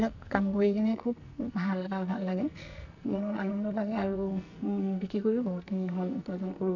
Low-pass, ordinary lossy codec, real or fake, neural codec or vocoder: 7.2 kHz; none; fake; codec, 44.1 kHz, 2.6 kbps, SNAC